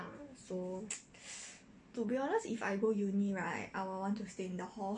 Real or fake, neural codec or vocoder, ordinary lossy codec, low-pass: real; none; MP3, 48 kbps; 9.9 kHz